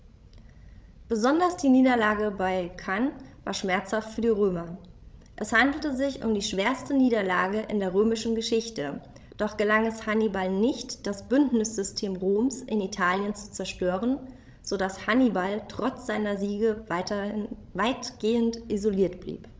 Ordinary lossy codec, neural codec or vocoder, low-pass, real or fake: none; codec, 16 kHz, 16 kbps, FreqCodec, larger model; none; fake